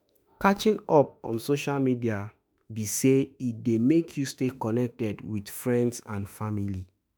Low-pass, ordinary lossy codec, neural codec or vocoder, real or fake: none; none; autoencoder, 48 kHz, 32 numbers a frame, DAC-VAE, trained on Japanese speech; fake